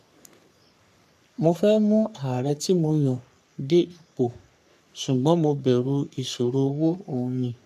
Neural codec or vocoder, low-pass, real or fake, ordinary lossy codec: codec, 44.1 kHz, 3.4 kbps, Pupu-Codec; 14.4 kHz; fake; none